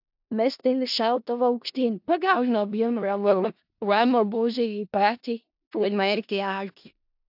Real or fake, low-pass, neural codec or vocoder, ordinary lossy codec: fake; 5.4 kHz; codec, 16 kHz in and 24 kHz out, 0.4 kbps, LongCat-Audio-Codec, four codebook decoder; AAC, 48 kbps